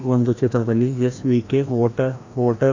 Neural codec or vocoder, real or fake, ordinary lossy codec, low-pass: codec, 16 kHz, 1 kbps, FreqCodec, larger model; fake; AAC, 48 kbps; 7.2 kHz